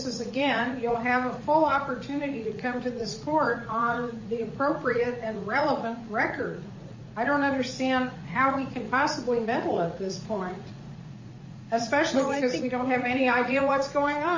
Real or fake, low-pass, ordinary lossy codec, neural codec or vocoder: fake; 7.2 kHz; MP3, 32 kbps; vocoder, 44.1 kHz, 80 mel bands, Vocos